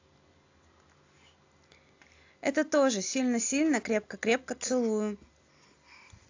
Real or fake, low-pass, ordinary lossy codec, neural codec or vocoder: real; 7.2 kHz; AAC, 48 kbps; none